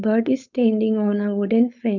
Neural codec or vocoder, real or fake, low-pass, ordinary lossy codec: codec, 16 kHz, 4.8 kbps, FACodec; fake; 7.2 kHz; none